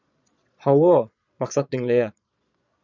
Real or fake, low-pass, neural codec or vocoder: fake; 7.2 kHz; vocoder, 22.05 kHz, 80 mel bands, Vocos